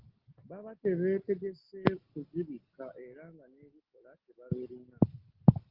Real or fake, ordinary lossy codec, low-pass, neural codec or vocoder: real; Opus, 16 kbps; 5.4 kHz; none